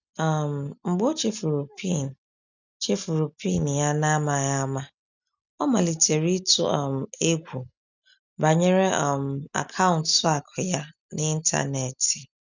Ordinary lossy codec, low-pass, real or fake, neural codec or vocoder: none; 7.2 kHz; real; none